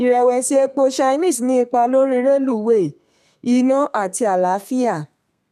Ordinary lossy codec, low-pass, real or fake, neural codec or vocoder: none; 14.4 kHz; fake; codec, 32 kHz, 1.9 kbps, SNAC